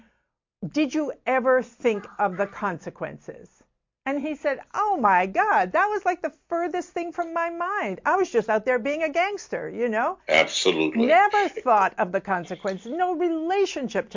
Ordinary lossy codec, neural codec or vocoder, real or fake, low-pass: MP3, 48 kbps; none; real; 7.2 kHz